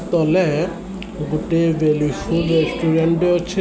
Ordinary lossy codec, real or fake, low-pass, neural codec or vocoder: none; real; none; none